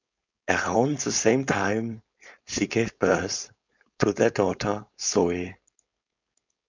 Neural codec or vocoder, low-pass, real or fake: codec, 16 kHz, 4.8 kbps, FACodec; 7.2 kHz; fake